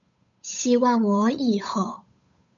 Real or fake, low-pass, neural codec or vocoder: fake; 7.2 kHz; codec, 16 kHz, 8 kbps, FunCodec, trained on Chinese and English, 25 frames a second